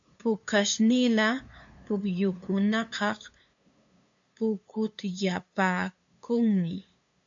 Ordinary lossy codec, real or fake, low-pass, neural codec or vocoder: AAC, 64 kbps; fake; 7.2 kHz; codec, 16 kHz, 2 kbps, FunCodec, trained on LibriTTS, 25 frames a second